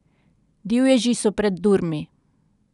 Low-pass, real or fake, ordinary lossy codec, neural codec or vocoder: 10.8 kHz; real; none; none